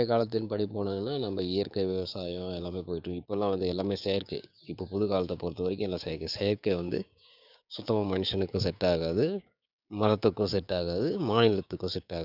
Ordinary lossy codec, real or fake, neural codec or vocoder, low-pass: none; fake; codec, 16 kHz, 6 kbps, DAC; 5.4 kHz